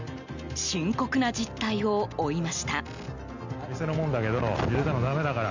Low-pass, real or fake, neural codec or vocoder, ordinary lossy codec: 7.2 kHz; real; none; none